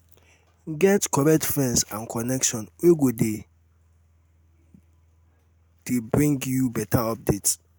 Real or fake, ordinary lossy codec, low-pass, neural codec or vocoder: real; none; none; none